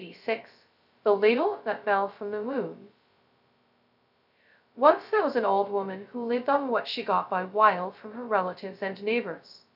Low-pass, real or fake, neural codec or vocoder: 5.4 kHz; fake; codec, 16 kHz, 0.2 kbps, FocalCodec